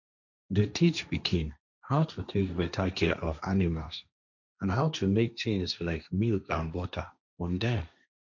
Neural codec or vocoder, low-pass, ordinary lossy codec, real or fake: codec, 16 kHz, 1.1 kbps, Voila-Tokenizer; 7.2 kHz; none; fake